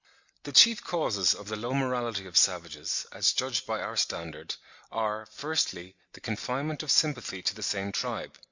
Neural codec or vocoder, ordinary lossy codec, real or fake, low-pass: none; Opus, 64 kbps; real; 7.2 kHz